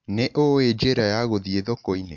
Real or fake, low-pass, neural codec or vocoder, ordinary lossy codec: real; 7.2 kHz; none; MP3, 64 kbps